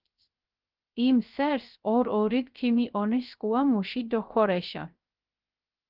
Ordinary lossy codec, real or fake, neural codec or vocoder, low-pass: Opus, 32 kbps; fake; codec, 16 kHz, 0.3 kbps, FocalCodec; 5.4 kHz